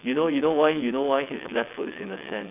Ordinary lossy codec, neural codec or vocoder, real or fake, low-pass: none; vocoder, 22.05 kHz, 80 mel bands, WaveNeXt; fake; 3.6 kHz